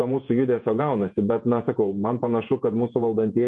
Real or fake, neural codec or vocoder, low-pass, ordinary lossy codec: fake; vocoder, 48 kHz, 128 mel bands, Vocos; 10.8 kHz; MP3, 48 kbps